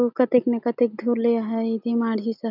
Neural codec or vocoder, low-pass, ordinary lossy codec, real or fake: none; 5.4 kHz; none; real